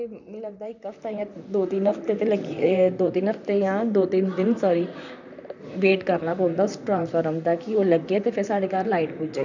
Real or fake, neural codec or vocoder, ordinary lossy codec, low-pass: fake; vocoder, 44.1 kHz, 128 mel bands, Pupu-Vocoder; none; 7.2 kHz